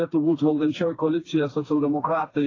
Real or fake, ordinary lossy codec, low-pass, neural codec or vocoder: fake; AAC, 32 kbps; 7.2 kHz; codec, 16 kHz, 2 kbps, FreqCodec, smaller model